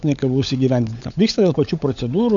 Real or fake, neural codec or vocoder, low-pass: fake; codec, 16 kHz, 16 kbps, FunCodec, trained on LibriTTS, 50 frames a second; 7.2 kHz